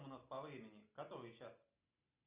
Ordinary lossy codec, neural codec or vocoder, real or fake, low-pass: Opus, 64 kbps; none; real; 3.6 kHz